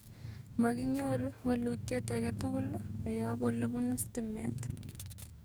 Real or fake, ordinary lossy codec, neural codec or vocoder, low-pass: fake; none; codec, 44.1 kHz, 2.6 kbps, DAC; none